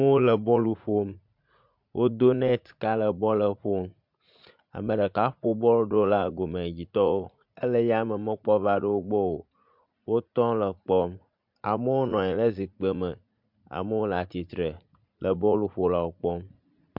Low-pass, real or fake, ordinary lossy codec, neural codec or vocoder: 5.4 kHz; fake; MP3, 48 kbps; vocoder, 44.1 kHz, 80 mel bands, Vocos